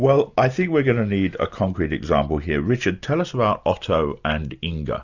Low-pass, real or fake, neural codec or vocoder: 7.2 kHz; real; none